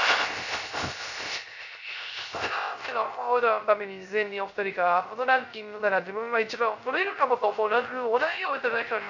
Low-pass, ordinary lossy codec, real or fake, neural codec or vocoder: 7.2 kHz; none; fake; codec, 16 kHz, 0.3 kbps, FocalCodec